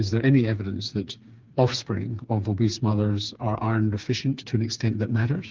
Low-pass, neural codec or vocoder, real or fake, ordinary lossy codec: 7.2 kHz; codec, 16 kHz, 4 kbps, FreqCodec, smaller model; fake; Opus, 32 kbps